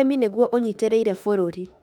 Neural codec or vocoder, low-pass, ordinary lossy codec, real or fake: autoencoder, 48 kHz, 32 numbers a frame, DAC-VAE, trained on Japanese speech; 19.8 kHz; none; fake